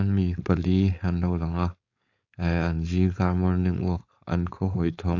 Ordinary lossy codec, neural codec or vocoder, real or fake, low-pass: AAC, 48 kbps; codec, 16 kHz, 4.8 kbps, FACodec; fake; 7.2 kHz